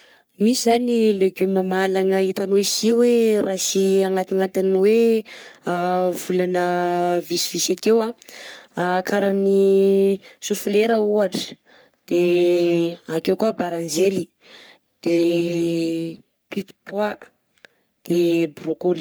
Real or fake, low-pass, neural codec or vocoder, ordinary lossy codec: fake; none; codec, 44.1 kHz, 3.4 kbps, Pupu-Codec; none